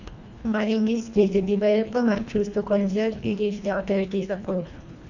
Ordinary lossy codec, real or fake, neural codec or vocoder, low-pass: none; fake; codec, 24 kHz, 1.5 kbps, HILCodec; 7.2 kHz